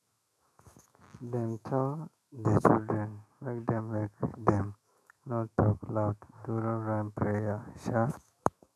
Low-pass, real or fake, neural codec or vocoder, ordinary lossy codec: 14.4 kHz; fake; autoencoder, 48 kHz, 128 numbers a frame, DAC-VAE, trained on Japanese speech; none